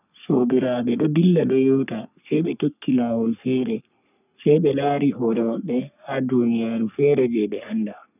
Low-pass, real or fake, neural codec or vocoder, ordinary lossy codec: 3.6 kHz; fake; codec, 44.1 kHz, 3.4 kbps, Pupu-Codec; none